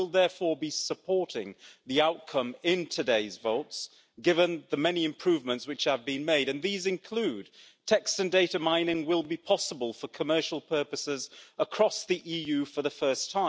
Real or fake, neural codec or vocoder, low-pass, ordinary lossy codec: real; none; none; none